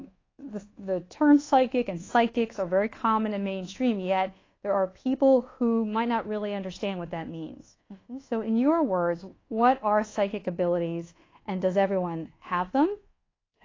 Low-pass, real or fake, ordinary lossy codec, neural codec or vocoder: 7.2 kHz; fake; AAC, 32 kbps; codec, 24 kHz, 1.2 kbps, DualCodec